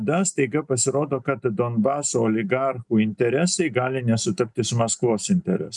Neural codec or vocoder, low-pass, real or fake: none; 10.8 kHz; real